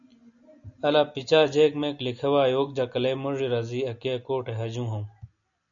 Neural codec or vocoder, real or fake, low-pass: none; real; 7.2 kHz